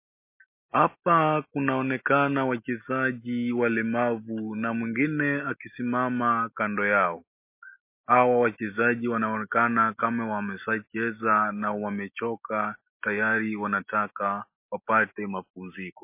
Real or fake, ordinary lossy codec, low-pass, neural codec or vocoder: real; MP3, 24 kbps; 3.6 kHz; none